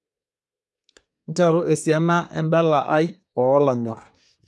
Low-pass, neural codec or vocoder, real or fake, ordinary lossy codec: none; codec, 24 kHz, 1 kbps, SNAC; fake; none